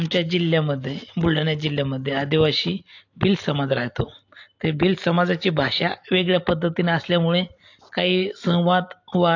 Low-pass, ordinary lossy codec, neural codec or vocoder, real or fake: 7.2 kHz; AAC, 48 kbps; none; real